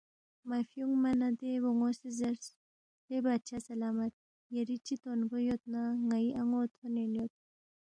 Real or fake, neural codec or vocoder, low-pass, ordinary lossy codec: real; none; 9.9 kHz; MP3, 64 kbps